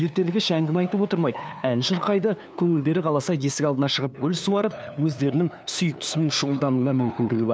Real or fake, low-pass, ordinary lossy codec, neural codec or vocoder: fake; none; none; codec, 16 kHz, 2 kbps, FunCodec, trained on LibriTTS, 25 frames a second